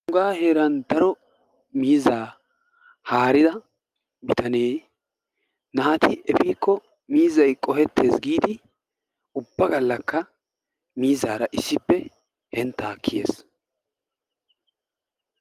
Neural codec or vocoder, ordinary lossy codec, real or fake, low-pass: none; Opus, 32 kbps; real; 14.4 kHz